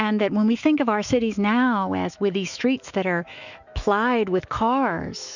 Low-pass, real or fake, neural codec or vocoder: 7.2 kHz; fake; autoencoder, 48 kHz, 128 numbers a frame, DAC-VAE, trained on Japanese speech